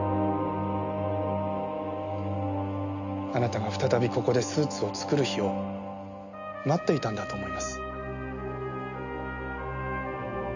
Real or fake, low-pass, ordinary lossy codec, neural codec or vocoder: real; 7.2 kHz; none; none